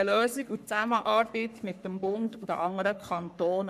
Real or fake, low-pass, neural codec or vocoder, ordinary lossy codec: fake; 14.4 kHz; codec, 44.1 kHz, 3.4 kbps, Pupu-Codec; none